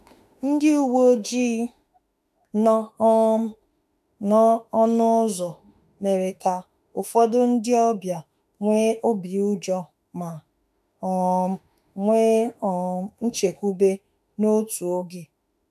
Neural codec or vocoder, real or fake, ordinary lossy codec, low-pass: autoencoder, 48 kHz, 32 numbers a frame, DAC-VAE, trained on Japanese speech; fake; AAC, 96 kbps; 14.4 kHz